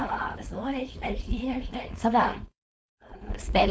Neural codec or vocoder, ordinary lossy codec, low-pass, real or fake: codec, 16 kHz, 4.8 kbps, FACodec; none; none; fake